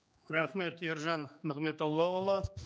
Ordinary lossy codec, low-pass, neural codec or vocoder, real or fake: none; none; codec, 16 kHz, 2 kbps, X-Codec, HuBERT features, trained on general audio; fake